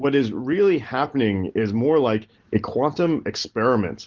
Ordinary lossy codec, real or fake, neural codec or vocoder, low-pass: Opus, 16 kbps; real; none; 7.2 kHz